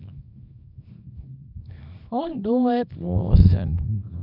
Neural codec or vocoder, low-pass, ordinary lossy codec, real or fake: codec, 24 kHz, 0.9 kbps, WavTokenizer, small release; 5.4 kHz; none; fake